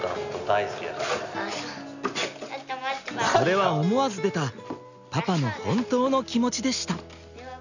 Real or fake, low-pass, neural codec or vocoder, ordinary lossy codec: real; 7.2 kHz; none; none